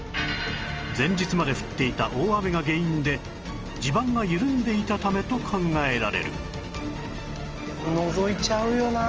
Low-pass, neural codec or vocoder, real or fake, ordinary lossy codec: 7.2 kHz; none; real; Opus, 24 kbps